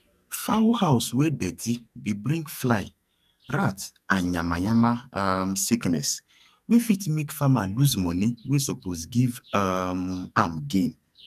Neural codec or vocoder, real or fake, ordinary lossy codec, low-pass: codec, 44.1 kHz, 2.6 kbps, SNAC; fake; none; 14.4 kHz